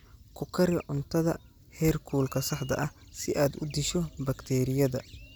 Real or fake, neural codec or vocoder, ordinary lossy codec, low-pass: real; none; none; none